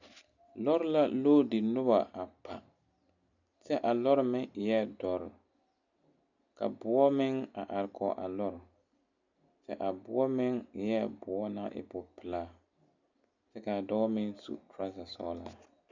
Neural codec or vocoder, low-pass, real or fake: none; 7.2 kHz; real